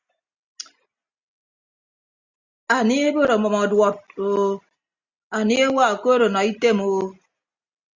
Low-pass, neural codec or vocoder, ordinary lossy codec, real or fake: 7.2 kHz; none; Opus, 64 kbps; real